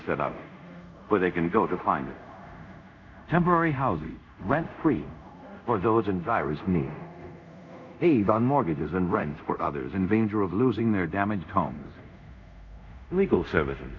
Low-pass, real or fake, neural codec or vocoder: 7.2 kHz; fake; codec, 24 kHz, 0.5 kbps, DualCodec